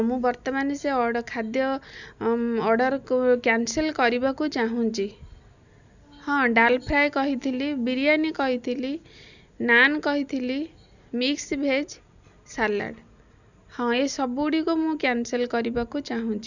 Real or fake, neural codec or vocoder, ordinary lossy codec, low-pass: real; none; none; 7.2 kHz